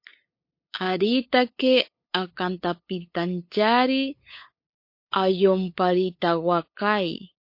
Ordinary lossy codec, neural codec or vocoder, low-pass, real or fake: MP3, 32 kbps; codec, 16 kHz, 8 kbps, FunCodec, trained on LibriTTS, 25 frames a second; 5.4 kHz; fake